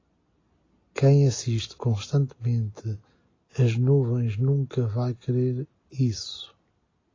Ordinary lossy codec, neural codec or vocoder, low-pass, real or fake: AAC, 32 kbps; none; 7.2 kHz; real